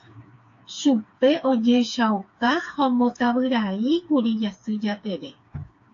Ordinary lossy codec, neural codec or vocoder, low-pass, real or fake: AAC, 48 kbps; codec, 16 kHz, 4 kbps, FreqCodec, smaller model; 7.2 kHz; fake